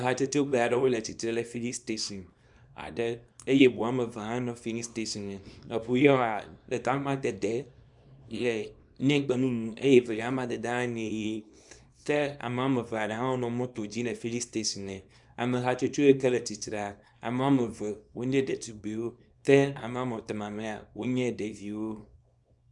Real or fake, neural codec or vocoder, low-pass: fake; codec, 24 kHz, 0.9 kbps, WavTokenizer, small release; 10.8 kHz